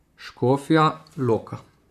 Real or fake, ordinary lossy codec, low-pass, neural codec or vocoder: fake; none; 14.4 kHz; vocoder, 44.1 kHz, 128 mel bands, Pupu-Vocoder